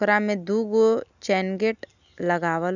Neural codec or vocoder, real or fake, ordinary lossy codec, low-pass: none; real; none; 7.2 kHz